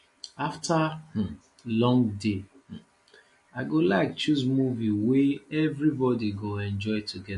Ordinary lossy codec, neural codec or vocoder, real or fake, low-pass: MP3, 48 kbps; none; real; 14.4 kHz